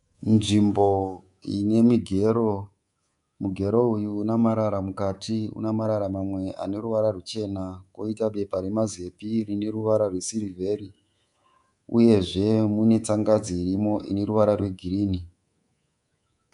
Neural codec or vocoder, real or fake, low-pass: codec, 24 kHz, 3.1 kbps, DualCodec; fake; 10.8 kHz